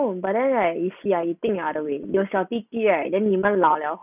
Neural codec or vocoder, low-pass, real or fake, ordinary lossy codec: none; 3.6 kHz; real; none